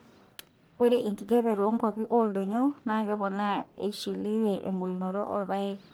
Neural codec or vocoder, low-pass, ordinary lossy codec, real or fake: codec, 44.1 kHz, 1.7 kbps, Pupu-Codec; none; none; fake